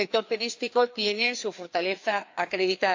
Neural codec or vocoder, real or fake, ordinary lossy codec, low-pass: codec, 16 kHz, 2 kbps, FreqCodec, larger model; fake; AAC, 48 kbps; 7.2 kHz